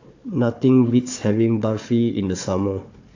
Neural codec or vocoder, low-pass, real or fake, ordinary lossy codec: codec, 16 kHz, 4 kbps, FunCodec, trained on Chinese and English, 50 frames a second; 7.2 kHz; fake; AAC, 48 kbps